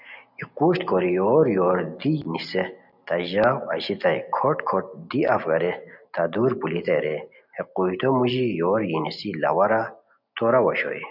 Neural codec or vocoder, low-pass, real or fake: none; 5.4 kHz; real